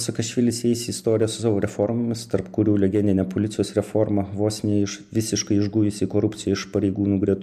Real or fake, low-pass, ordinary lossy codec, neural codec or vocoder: real; 14.4 kHz; MP3, 96 kbps; none